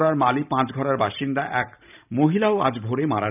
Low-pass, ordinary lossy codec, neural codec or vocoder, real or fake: 3.6 kHz; none; vocoder, 44.1 kHz, 128 mel bands every 512 samples, BigVGAN v2; fake